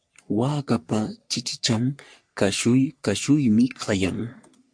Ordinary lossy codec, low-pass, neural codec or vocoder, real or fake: MP3, 64 kbps; 9.9 kHz; codec, 44.1 kHz, 3.4 kbps, Pupu-Codec; fake